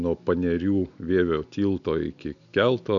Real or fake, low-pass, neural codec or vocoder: real; 7.2 kHz; none